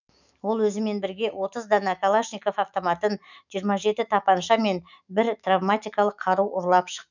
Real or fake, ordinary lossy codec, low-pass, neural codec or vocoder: fake; none; 7.2 kHz; autoencoder, 48 kHz, 128 numbers a frame, DAC-VAE, trained on Japanese speech